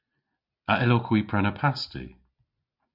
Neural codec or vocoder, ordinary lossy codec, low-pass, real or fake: none; MP3, 48 kbps; 5.4 kHz; real